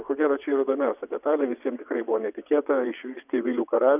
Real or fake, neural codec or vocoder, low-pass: fake; vocoder, 22.05 kHz, 80 mel bands, WaveNeXt; 3.6 kHz